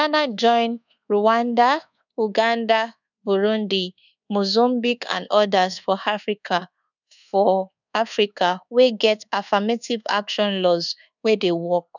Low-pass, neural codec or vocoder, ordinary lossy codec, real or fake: 7.2 kHz; codec, 24 kHz, 1.2 kbps, DualCodec; none; fake